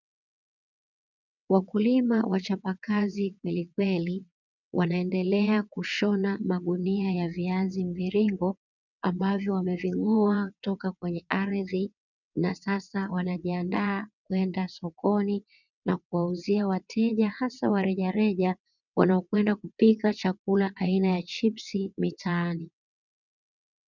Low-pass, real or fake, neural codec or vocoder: 7.2 kHz; fake; vocoder, 22.05 kHz, 80 mel bands, WaveNeXt